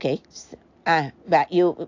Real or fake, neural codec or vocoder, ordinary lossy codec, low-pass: real; none; AAC, 48 kbps; 7.2 kHz